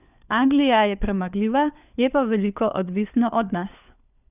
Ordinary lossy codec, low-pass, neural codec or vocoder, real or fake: none; 3.6 kHz; codec, 16 kHz, 4 kbps, FunCodec, trained on LibriTTS, 50 frames a second; fake